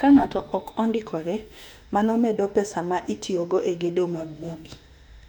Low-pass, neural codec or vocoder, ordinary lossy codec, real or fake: 19.8 kHz; autoencoder, 48 kHz, 32 numbers a frame, DAC-VAE, trained on Japanese speech; none; fake